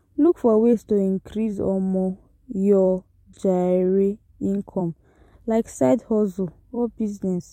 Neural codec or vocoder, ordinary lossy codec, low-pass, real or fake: vocoder, 44.1 kHz, 128 mel bands every 256 samples, BigVGAN v2; MP3, 64 kbps; 19.8 kHz; fake